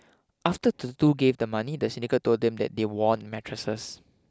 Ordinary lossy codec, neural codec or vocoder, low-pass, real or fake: none; none; none; real